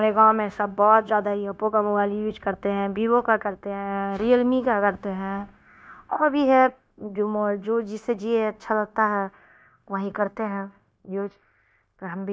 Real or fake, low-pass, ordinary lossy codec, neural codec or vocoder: fake; none; none; codec, 16 kHz, 0.9 kbps, LongCat-Audio-Codec